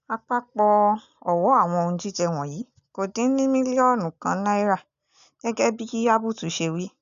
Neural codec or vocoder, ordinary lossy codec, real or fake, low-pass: none; AAC, 96 kbps; real; 7.2 kHz